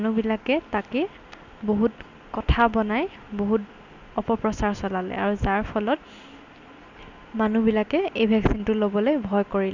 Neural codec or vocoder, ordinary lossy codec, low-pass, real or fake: none; none; 7.2 kHz; real